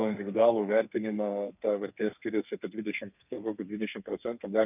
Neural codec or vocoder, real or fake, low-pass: codec, 32 kHz, 1.9 kbps, SNAC; fake; 3.6 kHz